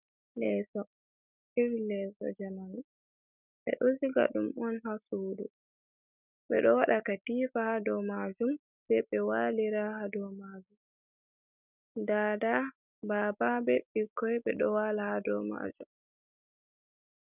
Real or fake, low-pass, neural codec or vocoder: real; 3.6 kHz; none